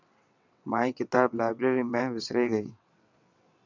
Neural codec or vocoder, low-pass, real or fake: vocoder, 22.05 kHz, 80 mel bands, WaveNeXt; 7.2 kHz; fake